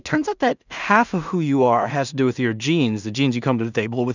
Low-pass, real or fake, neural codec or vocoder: 7.2 kHz; fake; codec, 16 kHz in and 24 kHz out, 0.4 kbps, LongCat-Audio-Codec, two codebook decoder